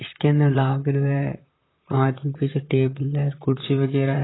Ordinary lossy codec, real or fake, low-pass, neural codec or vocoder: AAC, 16 kbps; fake; 7.2 kHz; vocoder, 44.1 kHz, 128 mel bands, Pupu-Vocoder